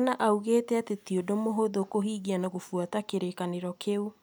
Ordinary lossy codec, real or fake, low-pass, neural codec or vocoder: none; real; none; none